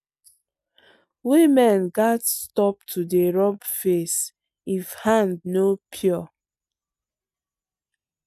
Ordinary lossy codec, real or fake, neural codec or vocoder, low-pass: none; real; none; 14.4 kHz